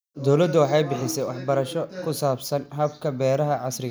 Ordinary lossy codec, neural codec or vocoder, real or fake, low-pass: none; none; real; none